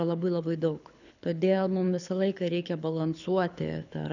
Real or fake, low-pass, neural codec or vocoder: fake; 7.2 kHz; codec, 24 kHz, 6 kbps, HILCodec